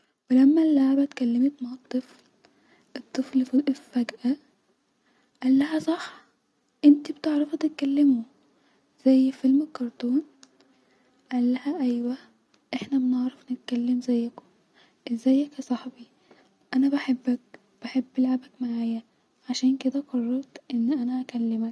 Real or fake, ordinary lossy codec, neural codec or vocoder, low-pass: real; none; none; none